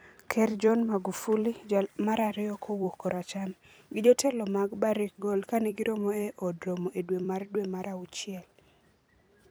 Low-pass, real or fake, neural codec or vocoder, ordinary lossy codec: none; fake; vocoder, 44.1 kHz, 128 mel bands every 256 samples, BigVGAN v2; none